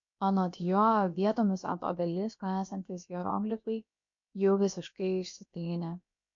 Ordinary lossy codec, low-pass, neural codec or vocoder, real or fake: MP3, 48 kbps; 7.2 kHz; codec, 16 kHz, about 1 kbps, DyCAST, with the encoder's durations; fake